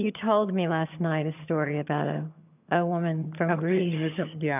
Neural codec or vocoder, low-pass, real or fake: vocoder, 22.05 kHz, 80 mel bands, HiFi-GAN; 3.6 kHz; fake